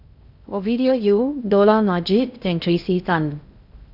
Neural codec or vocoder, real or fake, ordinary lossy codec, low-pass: codec, 16 kHz in and 24 kHz out, 0.6 kbps, FocalCodec, streaming, 2048 codes; fake; none; 5.4 kHz